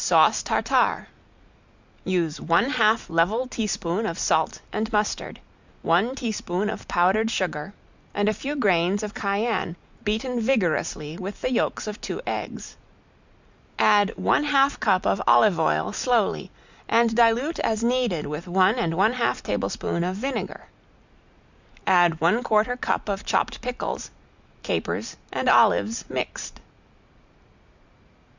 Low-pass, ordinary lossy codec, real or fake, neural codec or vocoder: 7.2 kHz; Opus, 64 kbps; real; none